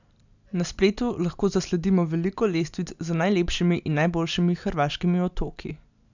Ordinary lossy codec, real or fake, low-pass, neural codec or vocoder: none; real; 7.2 kHz; none